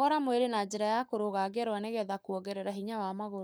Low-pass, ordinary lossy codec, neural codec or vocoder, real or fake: none; none; codec, 44.1 kHz, 7.8 kbps, Pupu-Codec; fake